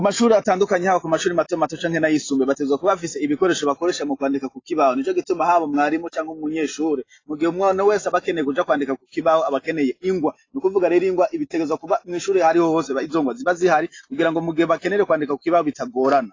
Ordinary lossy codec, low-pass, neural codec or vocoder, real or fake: AAC, 32 kbps; 7.2 kHz; none; real